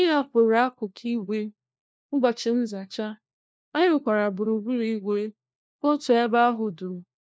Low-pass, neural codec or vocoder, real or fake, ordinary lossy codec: none; codec, 16 kHz, 1 kbps, FunCodec, trained on LibriTTS, 50 frames a second; fake; none